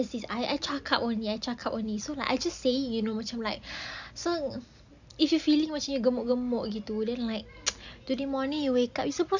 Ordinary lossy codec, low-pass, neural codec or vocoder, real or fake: none; 7.2 kHz; none; real